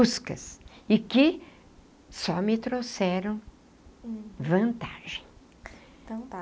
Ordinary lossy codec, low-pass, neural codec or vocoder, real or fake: none; none; none; real